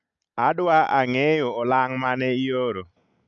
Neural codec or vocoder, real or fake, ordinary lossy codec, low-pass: none; real; none; 7.2 kHz